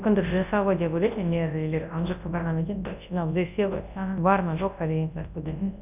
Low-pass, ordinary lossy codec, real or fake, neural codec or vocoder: 3.6 kHz; none; fake; codec, 24 kHz, 0.9 kbps, WavTokenizer, large speech release